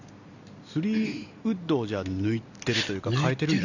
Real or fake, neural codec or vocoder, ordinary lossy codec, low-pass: real; none; none; 7.2 kHz